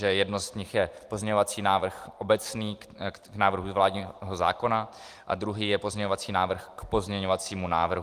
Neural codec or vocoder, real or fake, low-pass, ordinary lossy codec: none; real; 14.4 kHz; Opus, 24 kbps